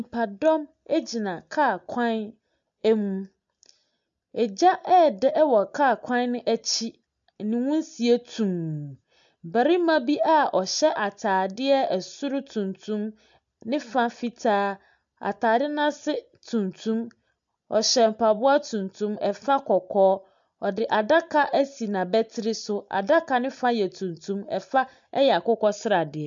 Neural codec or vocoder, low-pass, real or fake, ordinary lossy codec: none; 7.2 kHz; real; MP3, 64 kbps